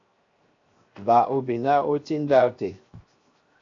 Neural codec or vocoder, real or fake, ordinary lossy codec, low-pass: codec, 16 kHz, 0.7 kbps, FocalCodec; fake; AAC, 48 kbps; 7.2 kHz